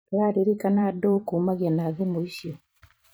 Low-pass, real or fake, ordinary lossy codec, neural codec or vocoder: none; fake; none; vocoder, 44.1 kHz, 128 mel bands every 512 samples, BigVGAN v2